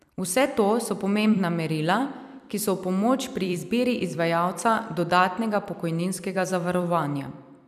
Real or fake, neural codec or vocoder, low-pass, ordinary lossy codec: fake; vocoder, 44.1 kHz, 128 mel bands every 256 samples, BigVGAN v2; 14.4 kHz; none